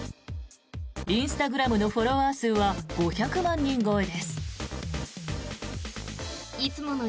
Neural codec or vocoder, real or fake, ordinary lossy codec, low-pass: none; real; none; none